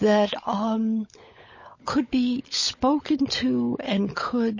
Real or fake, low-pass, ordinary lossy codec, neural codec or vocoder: fake; 7.2 kHz; MP3, 32 kbps; codec, 16 kHz, 16 kbps, FunCodec, trained on LibriTTS, 50 frames a second